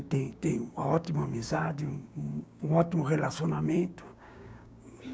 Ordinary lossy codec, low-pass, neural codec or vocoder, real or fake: none; none; codec, 16 kHz, 6 kbps, DAC; fake